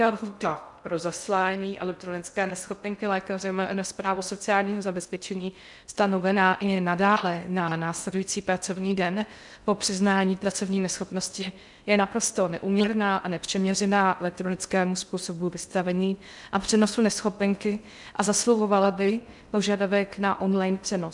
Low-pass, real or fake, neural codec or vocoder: 10.8 kHz; fake; codec, 16 kHz in and 24 kHz out, 0.6 kbps, FocalCodec, streaming, 2048 codes